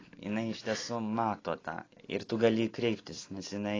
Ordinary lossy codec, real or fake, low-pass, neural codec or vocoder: AAC, 32 kbps; real; 7.2 kHz; none